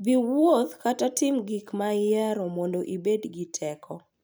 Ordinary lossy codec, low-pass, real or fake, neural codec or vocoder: none; none; real; none